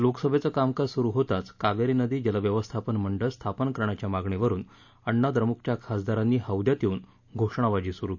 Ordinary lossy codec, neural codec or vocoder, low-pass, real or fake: none; none; 7.2 kHz; real